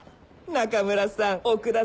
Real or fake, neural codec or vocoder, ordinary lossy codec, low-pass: real; none; none; none